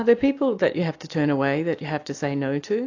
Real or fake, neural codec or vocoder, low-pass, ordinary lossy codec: real; none; 7.2 kHz; AAC, 48 kbps